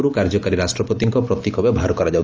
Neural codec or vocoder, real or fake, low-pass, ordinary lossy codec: none; real; 7.2 kHz; Opus, 24 kbps